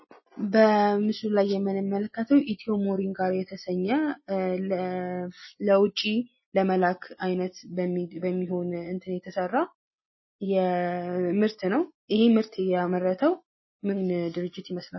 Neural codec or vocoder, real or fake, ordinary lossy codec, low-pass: none; real; MP3, 24 kbps; 7.2 kHz